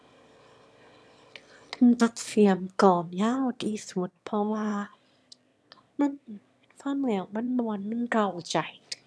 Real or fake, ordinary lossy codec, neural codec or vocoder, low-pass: fake; none; autoencoder, 22.05 kHz, a latent of 192 numbers a frame, VITS, trained on one speaker; none